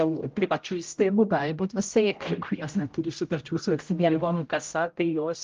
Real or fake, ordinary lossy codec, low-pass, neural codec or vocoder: fake; Opus, 32 kbps; 7.2 kHz; codec, 16 kHz, 0.5 kbps, X-Codec, HuBERT features, trained on general audio